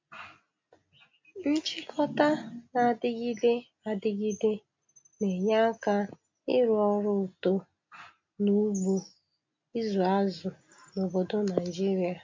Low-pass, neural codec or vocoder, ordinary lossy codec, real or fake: 7.2 kHz; none; MP3, 48 kbps; real